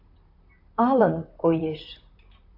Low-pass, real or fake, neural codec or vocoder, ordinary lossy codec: 5.4 kHz; fake; vocoder, 44.1 kHz, 128 mel bands, Pupu-Vocoder; AAC, 32 kbps